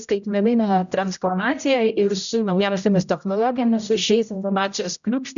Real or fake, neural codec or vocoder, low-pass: fake; codec, 16 kHz, 0.5 kbps, X-Codec, HuBERT features, trained on general audio; 7.2 kHz